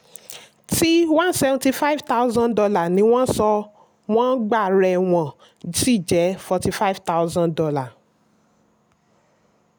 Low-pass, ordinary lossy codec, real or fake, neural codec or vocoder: none; none; real; none